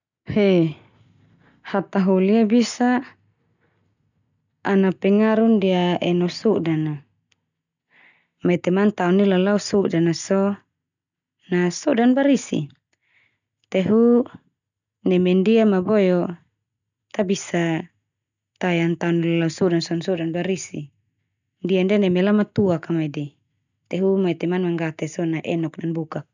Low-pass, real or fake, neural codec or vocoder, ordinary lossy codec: 7.2 kHz; real; none; none